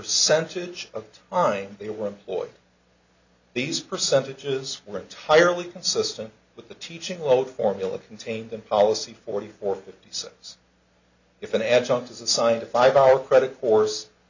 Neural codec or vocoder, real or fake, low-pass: none; real; 7.2 kHz